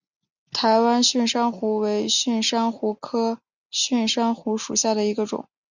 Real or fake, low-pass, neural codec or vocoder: real; 7.2 kHz; none